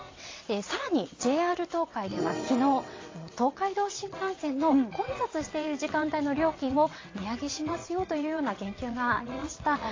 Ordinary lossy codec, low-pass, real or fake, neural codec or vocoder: AAC, 32 kbps; 7.2 kHz; fake; vocoder, 22.05 kHz, 80 mel bands, WaveNeXt